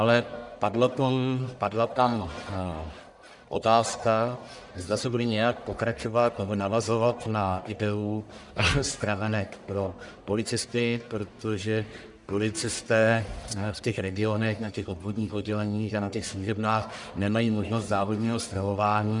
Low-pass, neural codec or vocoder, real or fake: 10.8 kHz; codec, 44.1 kHz, 1.7 kbps, Pupu-Codec; fake